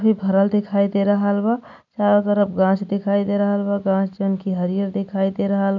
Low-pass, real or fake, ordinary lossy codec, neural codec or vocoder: 7.2 kHz; real; none; none